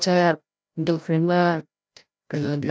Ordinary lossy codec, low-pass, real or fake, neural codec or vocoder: none; none; fake; codec, 16 kHz, 0.5 kbps, FreqCodec, larger model